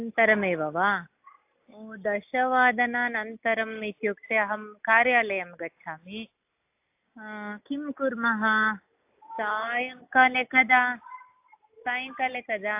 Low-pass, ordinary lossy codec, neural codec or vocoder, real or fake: 3.6 kHz; AAC, 32 kbps; none; real